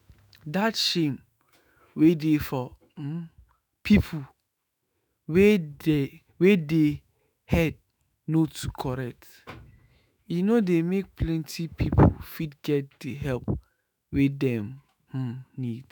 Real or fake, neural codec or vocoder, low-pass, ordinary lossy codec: fake; autoencoder, 48 kHz, 128 numbers a frame, DAC-VAE, trained on Japanese speech; none; none